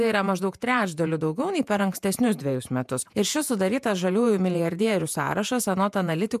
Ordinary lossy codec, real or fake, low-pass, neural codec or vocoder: MP3, 96 kbps; fake; 14.4 kHz; vocoder, 48 kHz, 128 mel bands, Vocos